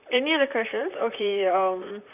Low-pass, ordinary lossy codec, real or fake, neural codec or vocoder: 3.6 kHz; none; fake; vocoder, 44.1 kHz, 128 mel bands, Pupu-Vocoder